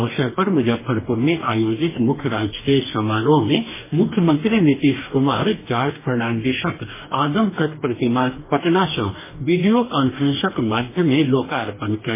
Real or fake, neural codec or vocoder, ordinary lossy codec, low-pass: fake; codec, 44.1 kHz, 2.6 kbps, DAC; MP3, 16 kbps; 3.6 kHz